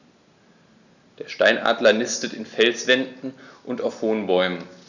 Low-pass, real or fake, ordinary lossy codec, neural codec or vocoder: 7.2 kHz; real; none; none